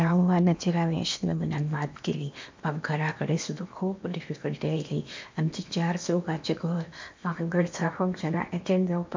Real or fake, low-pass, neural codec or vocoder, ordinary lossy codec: fake; 7.2 kHz; codec, 16 kHz in and 24 kHz out, 0.8 kbps, FocalCodec, streaming, 65536 codes; MP3, 64 kbps